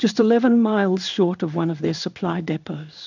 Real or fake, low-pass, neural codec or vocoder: fake; 7.2 kHz; codec, 16 kHz in and 24 kHz out, 1 kbps, XY-Tokenizer